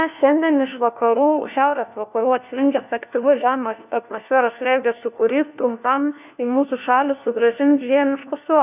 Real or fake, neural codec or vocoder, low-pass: fake; codec, 16 kHz, 1 kbps, FunCodec, trained on LibriTTS, 50 frames a second; 3.6 kHz